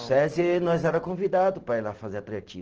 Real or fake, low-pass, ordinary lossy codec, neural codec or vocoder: real; 7.2 kHz; Opus, 16 kbps; none